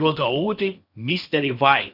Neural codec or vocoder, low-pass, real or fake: codec, 16 kHz in and 24 kHz out, 0.8 kbps, FocalCodec, streaming, 65536 codes; 5.4 kHz; fake